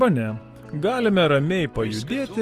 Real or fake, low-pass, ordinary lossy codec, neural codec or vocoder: real; 14.4 kHz; Opus, 24 kbps; none